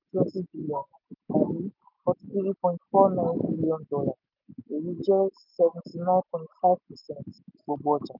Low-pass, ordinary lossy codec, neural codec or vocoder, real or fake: 5.4 kHz; none; none; real